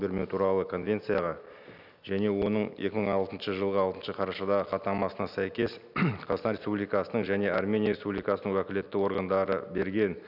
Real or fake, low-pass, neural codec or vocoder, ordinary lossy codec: real; 5.4 kHz; none; none